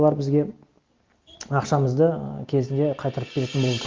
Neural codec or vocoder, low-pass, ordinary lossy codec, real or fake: none; 7.2 kHz; Opus, 32 kbps; real